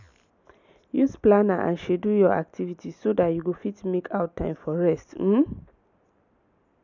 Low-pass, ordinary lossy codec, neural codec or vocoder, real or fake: 7.2 kHz; none; none; real